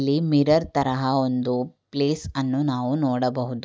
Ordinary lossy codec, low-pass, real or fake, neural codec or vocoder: none; none; real; none